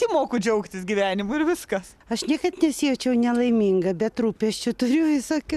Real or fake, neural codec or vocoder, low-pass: real; none; 14.4 kHz